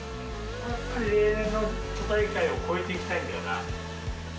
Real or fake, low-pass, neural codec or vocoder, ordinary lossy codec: real; none; none; none